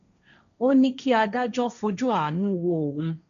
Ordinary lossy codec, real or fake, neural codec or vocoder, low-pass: none; fake; codec, 16 kHz, 1.1 kbps, Voila-Tokenizer; 7.2 kHz